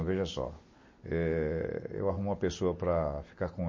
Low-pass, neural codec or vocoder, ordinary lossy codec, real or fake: 7.2 kHz; none; MP3, 32 kbps; real